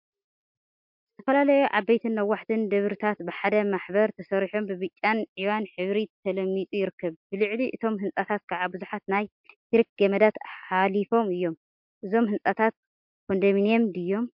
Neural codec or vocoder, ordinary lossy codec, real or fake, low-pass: none; MP3, 48 kbps; real; 5.4 kHz